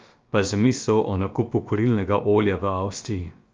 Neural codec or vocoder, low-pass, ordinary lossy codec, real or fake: codec, 16 kHz, about 1 kbps, DyCAST, with the encoder's durations; 7.2 kHz; Opus, 24 kbps; fake